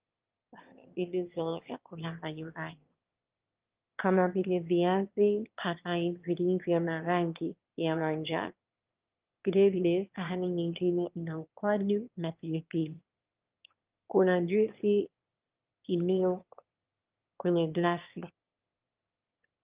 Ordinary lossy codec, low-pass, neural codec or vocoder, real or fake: Opus, 32 kbps; 3.6 kHz; autoencoder, 22.05 kHz, a latent of 192 numbers a frame, VITS, trained on one speaker; fake